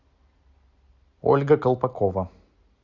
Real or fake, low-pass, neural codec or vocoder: real; 7.2 kHz; none